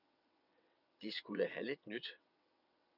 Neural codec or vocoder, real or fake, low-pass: vocoder, 22.05 kHz, 80 mel bands, WaveNeXt; fake; 5.4 kHz